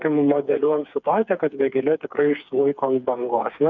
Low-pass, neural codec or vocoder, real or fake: 7.2 kHz; codec, 16 kHz, 4 kbps, FreqCodec, smaller model; fake